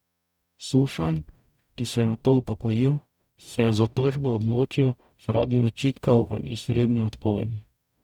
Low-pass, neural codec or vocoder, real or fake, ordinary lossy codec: 19.8 kHz; codec, 44.1 kHz, 0.9 kbps, DAC; fake; none